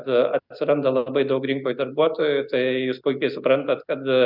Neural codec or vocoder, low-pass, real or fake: none; 5.4 kHz; real